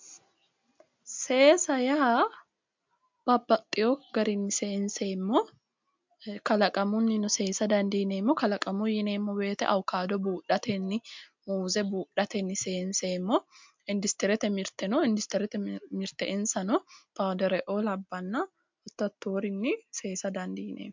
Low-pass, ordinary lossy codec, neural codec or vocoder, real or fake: 7.2 kHz; MP3, 64 kbps; none; real